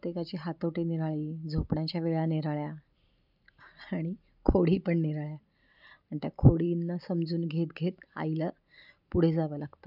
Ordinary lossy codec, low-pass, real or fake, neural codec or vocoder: none; 5.4 kHz; real; none